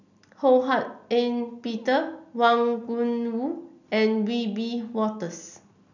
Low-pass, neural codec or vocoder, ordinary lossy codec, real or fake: 7.2 kHz; none; none; real